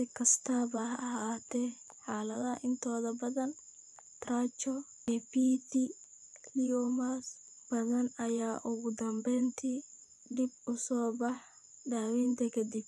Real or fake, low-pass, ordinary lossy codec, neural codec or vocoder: fake; none; none; vocoder, 24 kHz, 100 mel bands, Vocos